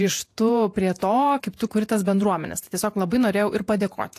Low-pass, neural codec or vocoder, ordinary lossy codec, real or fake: 14.4 kHz; vocoder, 48 kHz, 128 mel bands, Vocos; AAC, 64 kbps; fake